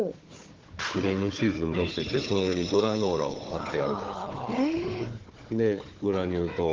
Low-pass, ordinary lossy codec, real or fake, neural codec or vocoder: 7.2 kHz; Opus, 16 kbps; fake; codec, 16 kHz, 4 kbps, FunCodec, trained on Chinese and English, 50 frames a second